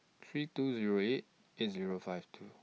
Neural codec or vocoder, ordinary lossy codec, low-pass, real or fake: none; none; none; real